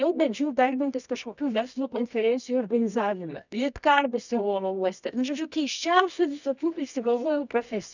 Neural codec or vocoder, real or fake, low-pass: codec, 24 kHz, 0.9 kbps, WavTokenizer, medium music audio release; fake; 7.2 kHz